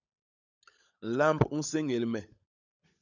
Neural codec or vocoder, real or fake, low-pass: codec, 16 kHz, 16 kbps, FunCodec, trained on LibriTTS, 50 frames a second; fake; 7.2 kHz